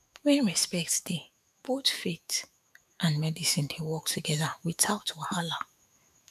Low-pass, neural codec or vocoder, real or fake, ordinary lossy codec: 14.4 kHz; autoencoder, 48 kHz, 128 numbers a frame, DAC-VAE, trained on Japanese speech; fake; none